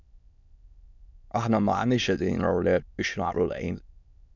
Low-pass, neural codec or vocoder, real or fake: 7.2 kHz; autoencoder, 22.05 kHz, a latent of 192 numbers a frame, VITS, trained on many speakers; fake